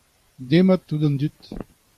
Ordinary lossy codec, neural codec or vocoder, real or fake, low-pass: AAC, 96 kbps; vocoder, 44.1 kHz, 128 mel bands every 512 samples, BigVGAN v2; fake; 14.4 kHz